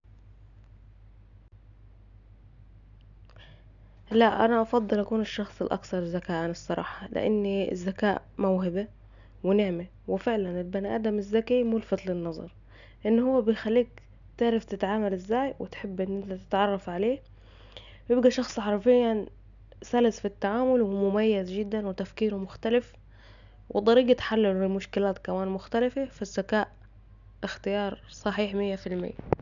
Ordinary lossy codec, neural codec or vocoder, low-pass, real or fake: MP3, 96 kbps; none; 7.2 kHz; real